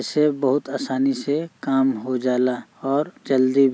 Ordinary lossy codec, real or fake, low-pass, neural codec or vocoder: none; real; none; none